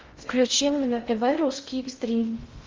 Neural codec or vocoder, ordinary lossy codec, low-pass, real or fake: codec, 16 kHz in and 24 kHz out, 0.6 kbps, FocalCodec, streaming, 2048 codes; Opus, 32 kbps; 7.2 kHz; fake